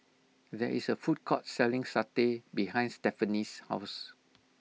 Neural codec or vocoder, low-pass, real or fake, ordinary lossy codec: none; none; real; none